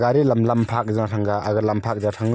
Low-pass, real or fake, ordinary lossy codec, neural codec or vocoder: none; real; none; none